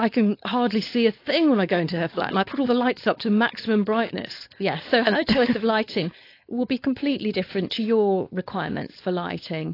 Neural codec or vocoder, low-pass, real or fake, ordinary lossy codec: codec, 16 kHz, 4.8 kbps, FACodec; 5.4 kHz; fake; AAC, 32 kbps